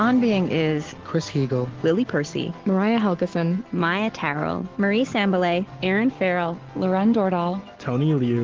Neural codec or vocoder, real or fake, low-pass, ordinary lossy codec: none; real; 7.2 kHz; Opus, 16 kbps